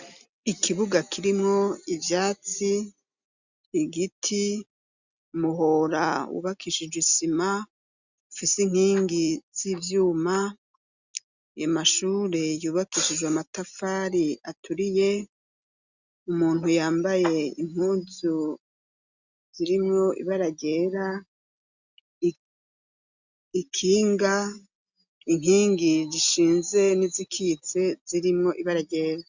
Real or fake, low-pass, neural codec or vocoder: real; 7.2 kHz; none